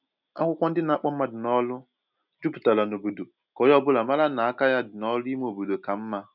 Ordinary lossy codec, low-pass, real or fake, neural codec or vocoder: none; 5.4 kHz; real; none